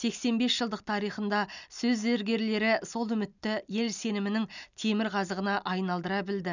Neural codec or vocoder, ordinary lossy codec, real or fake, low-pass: none; none; real; 7.2 kHz